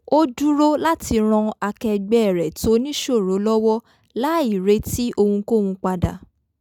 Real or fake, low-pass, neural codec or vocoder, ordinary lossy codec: real; 19.8 kHz; none; none